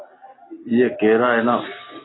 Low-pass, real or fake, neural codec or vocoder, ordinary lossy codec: 7.2 kHz; real; none; AAC, 16 kbps